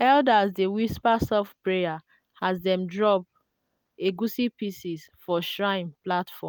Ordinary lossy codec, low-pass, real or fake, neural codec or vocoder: none; none; real; none